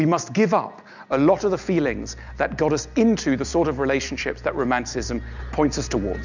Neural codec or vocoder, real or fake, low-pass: none; real; 7.2 kHz